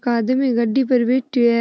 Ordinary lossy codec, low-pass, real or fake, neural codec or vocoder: none; none; real; none